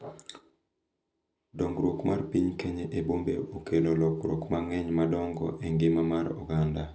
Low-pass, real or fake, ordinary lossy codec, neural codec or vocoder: none; real; none; none